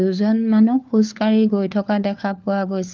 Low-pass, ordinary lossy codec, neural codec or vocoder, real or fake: 7.2 kHz; Opus, 32 kbps; codec, 16 kHz, 4 kbps, FunCodec, trained on LibriTTS, 50 frames a second; fake